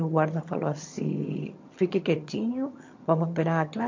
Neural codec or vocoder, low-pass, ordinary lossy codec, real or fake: vocoder, 22.05 kHz, 80 mel bands, HiFi-GAN; 7.2 kHz; MP3, 48 kbps; fake